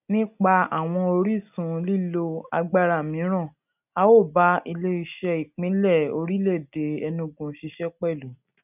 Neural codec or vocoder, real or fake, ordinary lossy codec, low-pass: none; real; none; 3.6 kHz